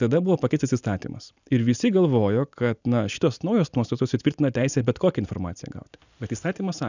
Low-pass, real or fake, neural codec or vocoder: 7.2 kHz; real; none